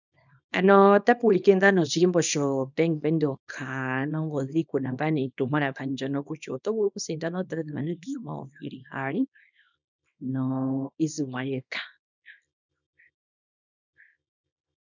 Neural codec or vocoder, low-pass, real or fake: codec, 24 kHz, 0.9 kbps, WavTokenizer, small release; 7.2 kHz; fake